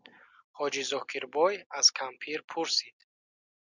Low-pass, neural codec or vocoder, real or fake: 7.2 kHz; none; real